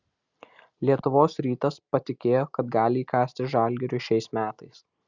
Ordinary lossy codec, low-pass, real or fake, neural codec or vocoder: Opus, 64 kbps; 7.2 kHz; real; none